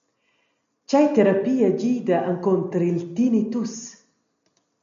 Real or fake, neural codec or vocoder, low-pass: real; none; 7.2 kHz